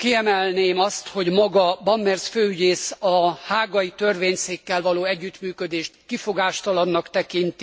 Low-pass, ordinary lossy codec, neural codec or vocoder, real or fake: none; none; none; real